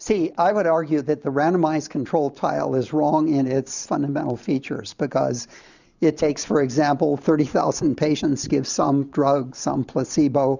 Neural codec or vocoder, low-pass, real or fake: vocoder, 44.1 kHz, 128 mel bands every 512 samples, BigVGAN v2; 7.2 kHz; fake